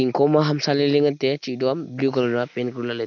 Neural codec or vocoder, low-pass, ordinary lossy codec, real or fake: none; 7.2 kHz; none; real